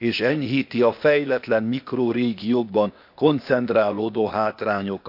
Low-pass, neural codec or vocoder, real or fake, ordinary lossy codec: 5.4 kHz; codec, 16 kHz, 0.8 kbps, ZipCodec; fake; none